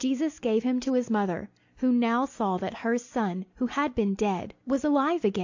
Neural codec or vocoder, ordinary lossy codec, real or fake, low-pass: none; AAC, 48 kbps; real; 7.2 kHz